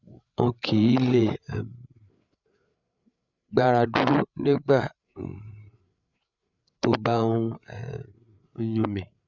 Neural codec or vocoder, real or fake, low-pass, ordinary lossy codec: codec, 16 kHz, 8 kbps, FreqCodec, larger model; fake; 7.2 kHz; none